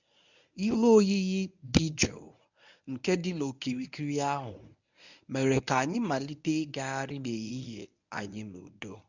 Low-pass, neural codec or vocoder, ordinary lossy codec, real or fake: 7.2 kHz; codec, 24 kHz, 0.9 kbps, WavTokenizer, medium speech release version 1; none; fake